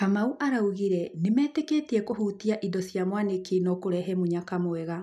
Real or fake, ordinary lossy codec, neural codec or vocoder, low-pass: real; none; none; 14.4 kHz